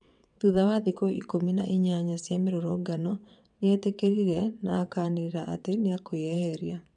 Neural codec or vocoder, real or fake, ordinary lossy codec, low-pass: vocoder, 22.05 kHz, 80 mel bands, WaveNeXt; fake; none; 9.9 kHz